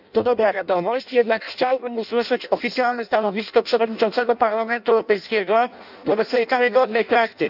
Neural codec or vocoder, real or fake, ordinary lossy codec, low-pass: codec, 16 kHz in and 24 kHz out, 0.6 kbps, FireRedTTS-2 codec; fake; none; 5.4 kHz